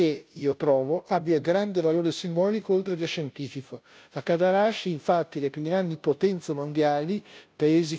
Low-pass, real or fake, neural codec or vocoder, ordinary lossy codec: none; fake; codec, 16 kHz, 0.5 kbps, FunCodec, trained on Chinese and English, 25 frames a second; none